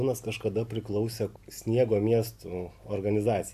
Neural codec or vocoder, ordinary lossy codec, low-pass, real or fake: none; AAC, 96 kbps; 14.4 kHz; real